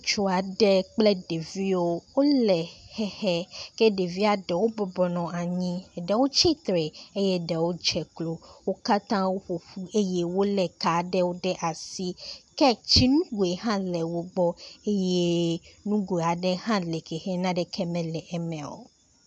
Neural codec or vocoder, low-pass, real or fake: none; 10.8 kHz; real